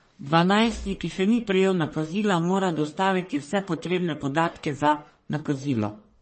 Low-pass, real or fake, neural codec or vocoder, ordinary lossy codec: 10.8 kHz; fake; codec, 44.1 kHz, 1.7 kbps, Pupu-Codec; MP3, 32 kbps